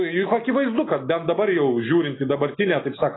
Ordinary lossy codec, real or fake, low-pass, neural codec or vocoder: AAC, 16 kbps; real; 7.2 kHz; none